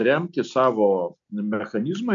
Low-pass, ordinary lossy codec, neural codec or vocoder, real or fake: 7.2 kHz; MP3, 64 kbps; none; real